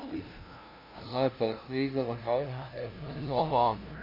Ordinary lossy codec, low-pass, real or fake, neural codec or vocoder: MP3, 48 kbps; 5.4 kHz; fake; codec, 16 kHz, 0.5 kbps, FunCodec, trained on LibriTTS, 25 frames a second